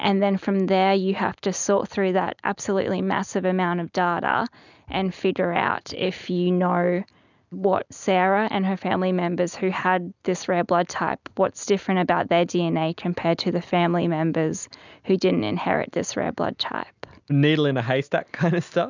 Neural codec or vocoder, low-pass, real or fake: none; 7.2 kHz; real